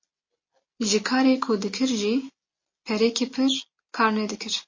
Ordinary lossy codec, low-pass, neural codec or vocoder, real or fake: MP3, 32 kbps; 7.2 kHz; none; real